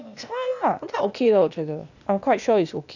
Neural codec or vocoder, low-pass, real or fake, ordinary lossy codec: codec, 16 kHz, 0.8 kbps, ZipCodec; 7.2 kHz; fake; none